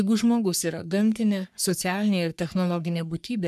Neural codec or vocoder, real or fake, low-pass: codec, 44.1 kHz, 3.4 kbps, Pupu-Codec; fake; 14.4 kHz